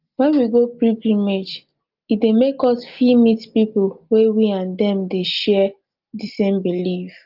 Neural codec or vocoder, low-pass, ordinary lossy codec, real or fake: none; 5.4 kHz; Opus, 32 kbps; real